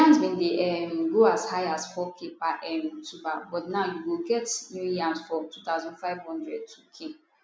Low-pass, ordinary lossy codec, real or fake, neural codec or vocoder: none; none; real; none